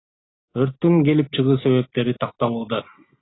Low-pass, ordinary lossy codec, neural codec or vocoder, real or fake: 7.2 kHz; AAC, 16 kbps; none; real